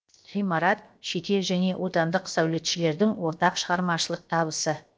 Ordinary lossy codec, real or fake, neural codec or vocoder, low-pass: none; fake; codec, 16 kHz, 0.7 kbps, FocalCodec; none